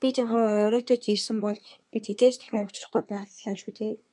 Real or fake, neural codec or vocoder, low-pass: fake; codec, 24 kHz, 1 kbps, SNAC; 10.8 kHz